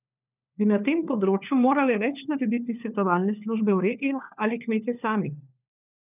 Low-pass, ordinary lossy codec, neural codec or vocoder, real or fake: 3.6 kHz; none; codec, 16 kHz, 4 kbps, FunCodec, trained on LibriTTS, 50 frames a second; fake